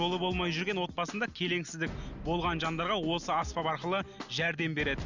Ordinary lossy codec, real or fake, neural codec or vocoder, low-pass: none; real; none; 7.2 kHz